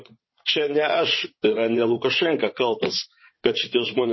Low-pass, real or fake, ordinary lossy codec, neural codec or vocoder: 7.2 kHz; fake; MP3, 24 kbps; vocoder, 22.05 kHz, 80 mel bands, WaveNeXt